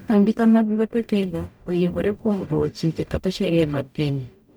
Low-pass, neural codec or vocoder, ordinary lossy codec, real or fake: none; codec, 44.1 kHz, 0.9 kbps, DAC; none; fake